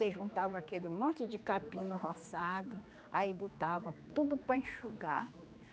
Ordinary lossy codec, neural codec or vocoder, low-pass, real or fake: none; codec, 16 kHz, 2 kbps, X-Codec, HuBERT features, trained on general audio; none; fake